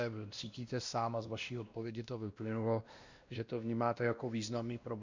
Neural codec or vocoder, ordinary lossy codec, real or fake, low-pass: codec, 16 kHz, 1 kbps, X-Codec, WavLM features, trained on Multilingual LibriSpeech; Opus, 64 kbps; fake; 7.2 kHz